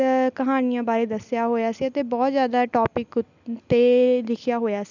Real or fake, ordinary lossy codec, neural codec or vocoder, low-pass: real; none; none; 7.2 kHz